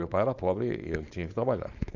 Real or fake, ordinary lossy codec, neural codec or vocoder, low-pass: fake; Opus, 64 kbps; codec, 16 kHz, 4.8 kbps, FACodec; 7.2 kHz